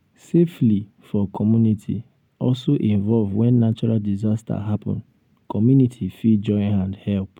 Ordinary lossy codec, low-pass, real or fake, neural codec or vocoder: none; 19.8 kHz; real; none